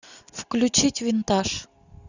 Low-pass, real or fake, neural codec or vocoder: 7.2 kHz; real; none